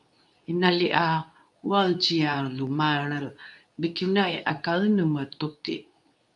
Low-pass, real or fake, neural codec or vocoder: 10.8 kHz; fake; codec, 24 kHz, 0.9 kbps, WavTokenizer, medium speech release version 2